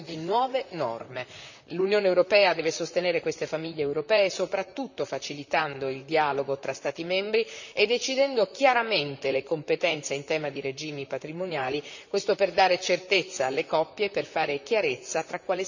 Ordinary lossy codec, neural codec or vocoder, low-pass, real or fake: none; vocoder, 44.1 kHz, 128 mel bands, Pupu-Vocoder; 7.2 kHz; fake